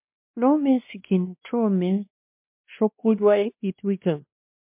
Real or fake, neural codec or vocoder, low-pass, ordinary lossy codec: fake; codec, 16 kHz, 1 kbps, X-Codec, WavLM features, trained on Multilingual LibriSpeech; 3.6 kHz; MP3, 32 kbps